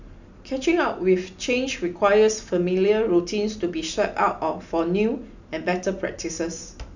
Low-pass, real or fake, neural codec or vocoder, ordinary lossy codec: 7.2 kHz; real; none; none